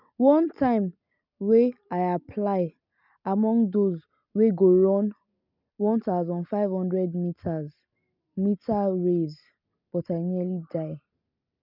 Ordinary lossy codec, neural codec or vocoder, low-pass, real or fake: none; none; 5.4 kHz; real